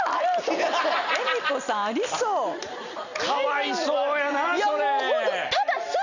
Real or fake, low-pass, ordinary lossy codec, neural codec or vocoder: real; 7.2 kHz; none; none